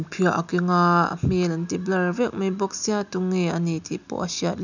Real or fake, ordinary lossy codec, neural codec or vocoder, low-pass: real; none; none; 7.2 kHz